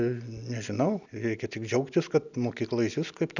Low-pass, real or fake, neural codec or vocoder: 7.2 kHz; real; none